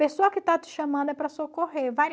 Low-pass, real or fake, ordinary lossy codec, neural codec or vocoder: none; real; none; none